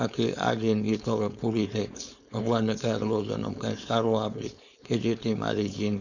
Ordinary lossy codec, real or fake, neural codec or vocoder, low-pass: none; fake; codec, 16 kHz, 4.8 kbps, FACodec; 7.2 kHz